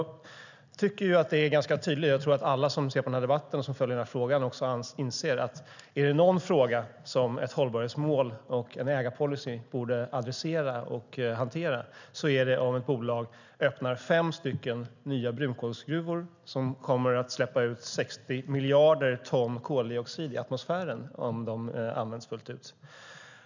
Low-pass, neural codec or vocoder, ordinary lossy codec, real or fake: 7.2 kHz; none; none; real